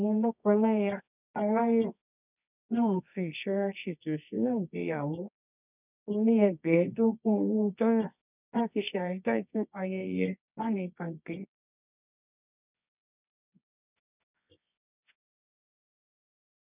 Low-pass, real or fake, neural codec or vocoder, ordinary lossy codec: 3.6 kHz; fake; codec, 24 kHz, 0.9 kbps, WavTokenizer, medium music audio release; none